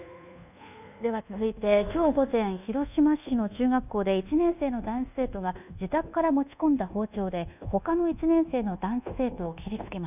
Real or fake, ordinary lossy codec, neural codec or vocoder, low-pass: fake; none; codec, 24 kHz, 1.2 kbps, DualCodec; 3.6 kHz